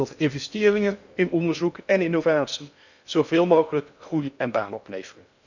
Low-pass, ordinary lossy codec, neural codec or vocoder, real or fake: 7.2 kHz; none; codec, 16 kHz in and 24 kHz out, 0.6 kbps, FocalCodec, streaming, 2048 codes; fake